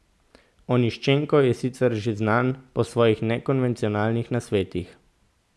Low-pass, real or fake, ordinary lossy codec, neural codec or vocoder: none; real; none; none